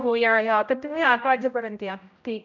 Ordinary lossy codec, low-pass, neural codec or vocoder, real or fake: none; 7.2 kHz; codec, 16 kHz, 0.5 kbps, X-Codec, HuBERT features, trained on general audio; fake